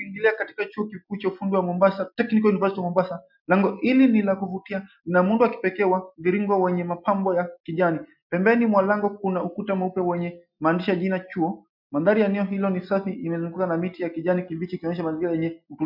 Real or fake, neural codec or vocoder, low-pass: real; none; 5.4 kHz